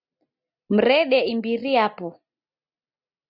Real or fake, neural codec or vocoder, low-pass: real; none; 5.4 kHz